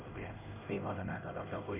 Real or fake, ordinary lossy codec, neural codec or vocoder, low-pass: fake; none; codec, 16 kHz, 1 kbps, X-Codec, HuBERT features, trained on LibriSpeech; 3.6 kHz